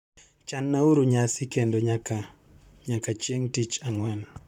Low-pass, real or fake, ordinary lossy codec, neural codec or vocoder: 19.8 kHz; fake; none; vocoder, 44.1 kHz, 128 mel bands, Pupu-Vocoder